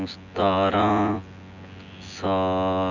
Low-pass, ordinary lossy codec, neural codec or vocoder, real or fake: 7.2 kHz; none; vocoder, 24 kHz, 100 mel bands, Vocos; fake